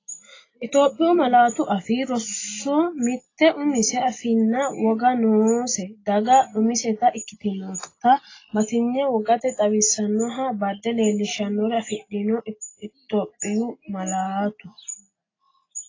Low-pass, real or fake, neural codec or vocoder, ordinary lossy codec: 7.2 kHz; real; none; AAC, 32 kbps